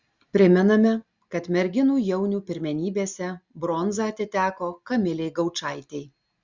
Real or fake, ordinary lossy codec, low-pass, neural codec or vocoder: real; Opus, 64 kbps; 7.2 kHz; none